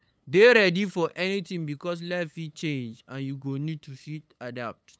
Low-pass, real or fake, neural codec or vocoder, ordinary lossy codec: none; fake; codec, 16 kHz, 8 kbps, FunCodec, trained on LibriTTS, 25 frames a second; none